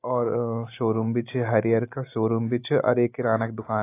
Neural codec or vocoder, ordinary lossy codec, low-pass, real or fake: none; AAC, 24 kbps; 3.6 kHz; real